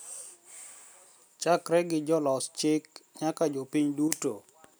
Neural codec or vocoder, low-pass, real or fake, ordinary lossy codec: none; none; real; none